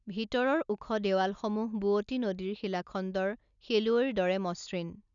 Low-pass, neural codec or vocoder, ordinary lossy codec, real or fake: 7.2 kHz; none; none; real